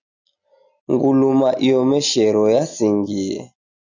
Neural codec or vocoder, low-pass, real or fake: none; 7.2 kHz; real